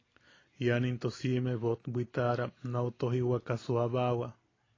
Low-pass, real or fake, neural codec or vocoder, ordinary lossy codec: 7.2 kHz; real; none; AAC, 32 kbps